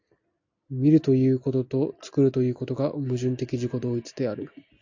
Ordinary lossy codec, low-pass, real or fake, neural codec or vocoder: AAC, 48 kbps; 7.2 kHz; real; none